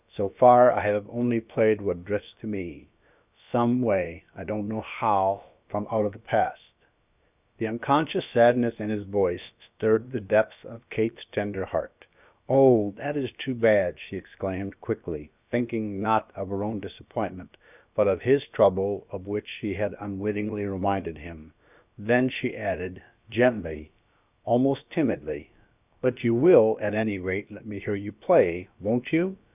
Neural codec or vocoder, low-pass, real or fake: codec, 16 kHz, about 1 kbps, DyCAST, with the encoder's durations; 3.6 kHz; fake